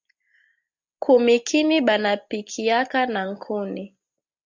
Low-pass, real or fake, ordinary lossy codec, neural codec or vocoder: 7.2 kHz; real; MP3, 64 kbps; none